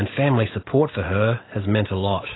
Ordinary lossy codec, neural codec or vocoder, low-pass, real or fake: AAC, 16 kbps; none; 7.2 kHz; real